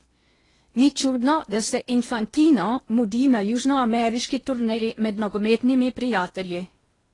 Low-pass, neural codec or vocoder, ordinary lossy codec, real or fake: 10.8 kHz; codec, 16 kHz in and 24 kHz out, 0.8 kbps, FocalCodec, streaming, 65536 codes; AAC, 32 kbps; fake